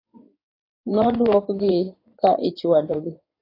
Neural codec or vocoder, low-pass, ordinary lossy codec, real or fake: codec, 16 kHz in and 24 kHz out, 2.2 kbps, FireRedTTS-2 codec; 5.4 kHz; AAC, 24 kbps; fake